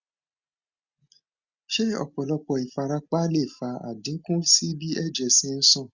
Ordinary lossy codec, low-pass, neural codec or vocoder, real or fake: Opus, 64 kbps; 7.2 kHz; none; real